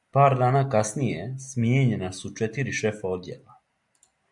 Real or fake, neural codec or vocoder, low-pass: real; none; 10.8 kHz